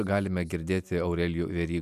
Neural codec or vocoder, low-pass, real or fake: none; 14.4 kHz; real